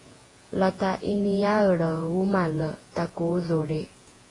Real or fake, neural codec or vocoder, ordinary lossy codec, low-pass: fake; vocoder, 48 kHz, 128 mel bands, Vocos; AAC, 32 kbps; 10.8 kHz